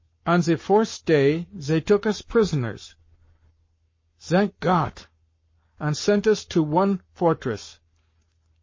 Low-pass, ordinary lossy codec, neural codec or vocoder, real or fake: 7.2 kHz; MP3, 32 kbps; codec, 44.1 kHz, 7.8 kbps, Pupu-Codec; fake